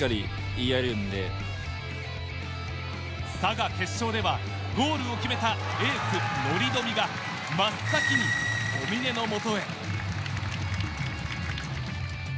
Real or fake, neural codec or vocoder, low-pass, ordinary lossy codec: real; none; none; none